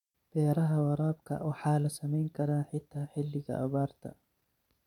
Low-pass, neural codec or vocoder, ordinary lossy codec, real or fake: 19.8 kHz; vocoder, 44.1 kHz, 128 mel bands every 512 samples, BigVGAN v2; none; fake